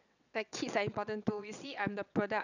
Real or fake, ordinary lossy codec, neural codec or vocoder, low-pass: fake; none; vocoder, 22.05 kHz, 80 mel bands, WaveNeXt; 7.2 kHz